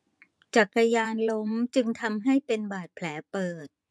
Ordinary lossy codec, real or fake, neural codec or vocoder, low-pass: none; fake; vocoder, 24 kHz, 100 mel bands, Vocos; none